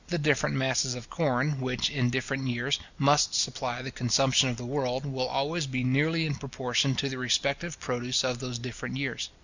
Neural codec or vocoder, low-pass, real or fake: none; 7.2 kHz; real